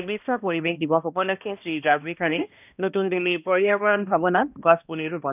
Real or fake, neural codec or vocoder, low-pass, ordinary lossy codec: fake; codec, 16 kHz, 1 kbps, X-Codec, HuBERT features, trained on balanced general audio; 3.6 kHz; none